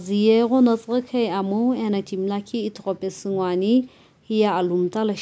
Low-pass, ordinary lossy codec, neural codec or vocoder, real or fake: none; none; none; real